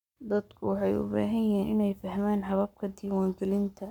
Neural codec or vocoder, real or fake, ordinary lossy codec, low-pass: codec, 44.1 kHz, 7.8 kbps, Pupu-Codec; fake; none; 19.8 kHz